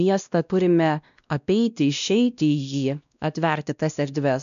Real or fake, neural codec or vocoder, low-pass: fake; codec, 16 kHz, 1 kbps, X-Codec, WavLM features, trained on Multilingual LibriSpeech; 7.2 kHz